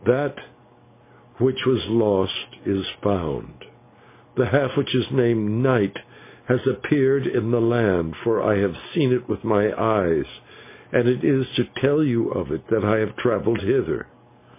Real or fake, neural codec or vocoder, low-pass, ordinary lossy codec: real; none; 3.6 kHz; MP3, 24 kbps